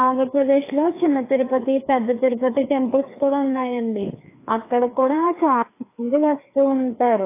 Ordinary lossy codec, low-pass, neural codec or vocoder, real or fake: AAC, 24 kbps; 3.6 kHz; codec, 16 kHz, 2 kbps, FreqCodec, larger model; fake